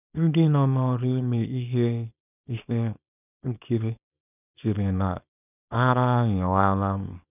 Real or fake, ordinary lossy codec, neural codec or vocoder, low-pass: fake; none; codec, 24 kHz, 0.9 kbps, WavTokenizer, small release; 3.6 kHz